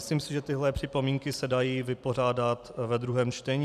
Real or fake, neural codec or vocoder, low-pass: real; none; 14.4 kHz